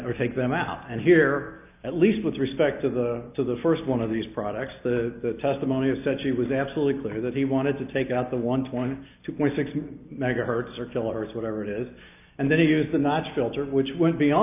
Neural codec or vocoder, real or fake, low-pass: none; real; 3.6 kHz